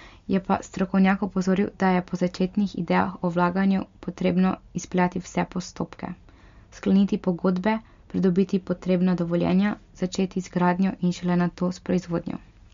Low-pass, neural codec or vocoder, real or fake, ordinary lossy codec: 7.2 kHz; none; real; MP3, 48 kbps